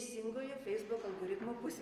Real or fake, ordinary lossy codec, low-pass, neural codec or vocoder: real; Opus, 32 kbps; 14.4 kHz; none